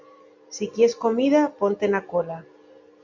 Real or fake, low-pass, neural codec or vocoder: real; 7.2 kHz; none